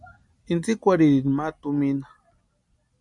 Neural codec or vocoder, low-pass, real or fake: vocoder, 44.1 kHz, 128 mel bands every 256 samples, BigVGAN v2; 10.8 kHz; fake